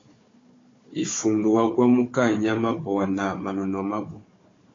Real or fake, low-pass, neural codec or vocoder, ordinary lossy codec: fake; 7.2 kHz; codec, 16 kHz, 4 kbps, FunCodec, trained on Chinese and English, 50 frames a second; AAC, 32 kbps